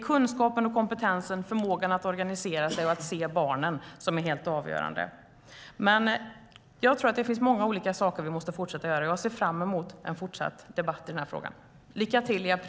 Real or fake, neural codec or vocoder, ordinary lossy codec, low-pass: real; none; none; none